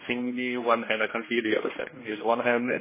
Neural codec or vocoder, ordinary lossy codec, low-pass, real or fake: codec, 16 kHz, 1 kbps, X-Codec, HuBERT features, trained on general audio; MP3, 16 kbps; 3.6 kHz; fake